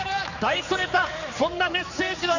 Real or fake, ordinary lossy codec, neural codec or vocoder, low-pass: fake; none; codec, 16 kHz, 4 kbps, X-Codec, HuBERT features, trained on general audio; 7.2 kHz